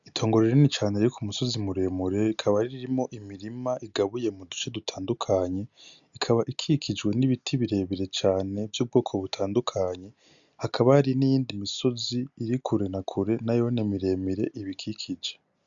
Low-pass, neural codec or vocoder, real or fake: 7.2 kHz; none; real